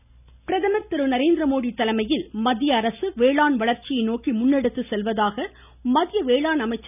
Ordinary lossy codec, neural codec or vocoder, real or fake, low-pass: none; none; real; 3.6 kHz